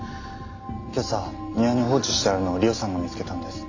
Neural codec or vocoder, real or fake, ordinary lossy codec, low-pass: none; real; AAC, 48 kbps; 7.2 kHz